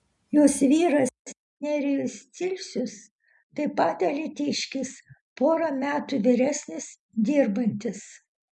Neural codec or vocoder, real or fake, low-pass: none; real; 10.8 kHz